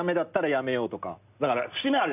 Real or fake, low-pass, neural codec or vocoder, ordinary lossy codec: real; 3.6 kHz; none; none